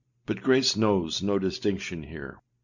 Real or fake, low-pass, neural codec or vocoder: real; 7.2 kHz; none